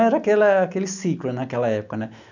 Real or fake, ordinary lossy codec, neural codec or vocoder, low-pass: real; none; none; 7.2 kHz